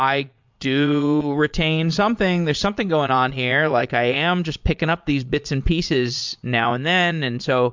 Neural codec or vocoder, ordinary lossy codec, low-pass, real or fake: vocoder, 22.05 kHz, 80 mel bands, Vocos; MP3, 64 kbps; 7.2 kHz; fake